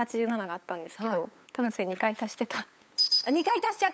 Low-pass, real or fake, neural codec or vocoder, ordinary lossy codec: none; fake; codec, 16 kHz, 8 kbps, FunCodec, trained on LibriTTS, 25 frames a second; none